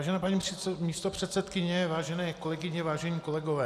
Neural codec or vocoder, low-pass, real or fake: vocoder, 44.1 kHz, 128 mel bands every 256 samples, BigVGAN v2; 14.4 kHz; fake